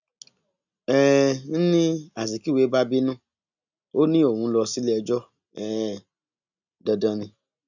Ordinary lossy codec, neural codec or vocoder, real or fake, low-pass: none; none; real; 7.2 kHz